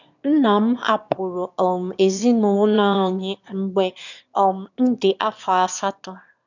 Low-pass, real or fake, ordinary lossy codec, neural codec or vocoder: 7.2 kHz; fake; none; autoencoder, 22.05 kHz, a latent of 192 numbers a frame, VITS, trained on one speaker